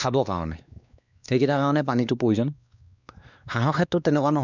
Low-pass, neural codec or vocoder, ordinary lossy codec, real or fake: 7.2 kHz; codec, 16 kHz, 2 kbps, X-Codec, WavLM features, trained on Multilingual LibriSpeech; none; fake